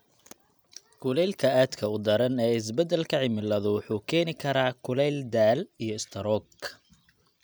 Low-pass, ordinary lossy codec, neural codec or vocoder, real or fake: none; none; none; real